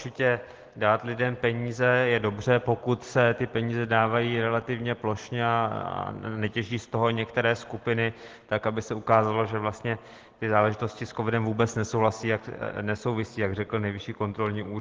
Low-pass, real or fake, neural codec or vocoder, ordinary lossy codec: 7.2 kHz; real; none; Opus, 16 kbps